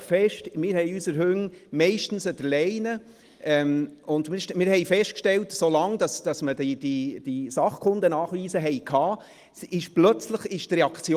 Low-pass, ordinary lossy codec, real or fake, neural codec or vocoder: 14.4 kHz; Opus, 24 kbps; real; none